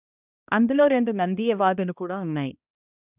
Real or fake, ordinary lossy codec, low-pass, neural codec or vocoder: fake; none; 3.6 kHz; codec, 16 kHz, 1 kbps, X-Codec, HuBERT features, trained on balanced general audio